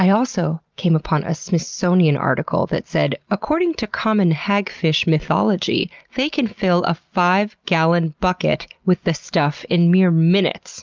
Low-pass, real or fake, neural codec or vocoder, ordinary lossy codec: 7.2 kHz; real; none; Opus, 24 kbps